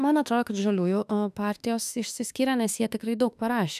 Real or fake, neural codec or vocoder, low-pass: fake; autoencoder, 48 kHz, 32 numbers a frame, DAC-VAE, trained on Japanese speech; 14.4 kHz